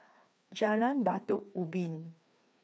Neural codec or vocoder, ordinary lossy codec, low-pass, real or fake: codec, 16 kHz, 2 kbps, FreqCodec, larger model; none; none; fake